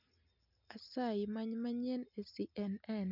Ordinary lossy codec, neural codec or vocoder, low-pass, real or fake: none; none; 5.4 kHz; real